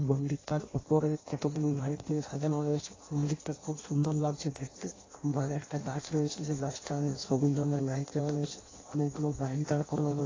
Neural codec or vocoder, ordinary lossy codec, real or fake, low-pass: codec, 16 kHz in and 24 kHz out, 0.6 kbps, FireRedTTS-2 codec; AAC, 32 kbps; fake; 7.2 kHz